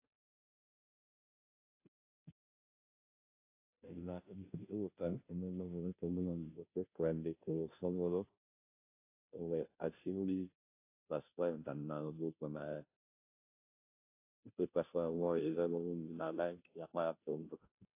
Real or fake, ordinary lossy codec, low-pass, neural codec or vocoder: fake; AAC, 32 kbps; 3.6 kHz; codec, 16 kHz, 0.5 kbps, FunCodec, trained on Chinese and English, 25 frames a second